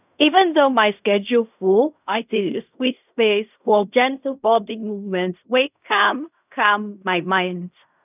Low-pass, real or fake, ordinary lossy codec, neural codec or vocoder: 3.6 kHz; fake; none; codec, 16 kHz in and 24 kHz out, 0.4 kbps, LongCat-Audio-Codec, fine tuned four codebook decoder